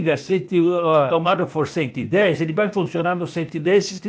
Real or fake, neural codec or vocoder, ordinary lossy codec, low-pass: fake; codec, 16 kHz, 0.8 kbps, ZipCodec; none; none